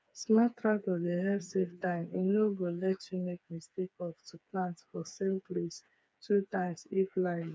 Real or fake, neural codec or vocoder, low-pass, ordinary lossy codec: fake; codec, 16 kHz, 4 kbps, FreqCodec, smaller model; none; none